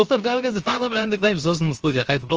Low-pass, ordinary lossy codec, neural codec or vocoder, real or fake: 7.2 kHz; Opus, 24 kbps; codec, 16 kHz, 0.7 kbps, FocalCodec; fake